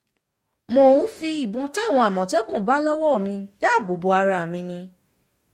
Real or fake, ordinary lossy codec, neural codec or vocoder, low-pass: fake; MP3, 64 kbps; codec, 44.1 kHz, 2.6 kbps, DAC; 19.8 kHz